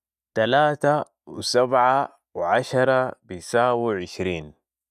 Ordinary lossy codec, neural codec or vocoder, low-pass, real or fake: none; none; 14.4 kHz; real